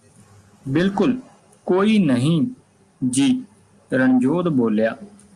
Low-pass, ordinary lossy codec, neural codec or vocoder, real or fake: 10.8 kHz; Opus, 32 kbps; none; real